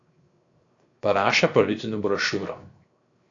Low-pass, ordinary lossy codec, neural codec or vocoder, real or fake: 7.2 kHz; AAC, 48 kbps; codec, 16 kHz, 0.7 kbps, FocalCodec; fake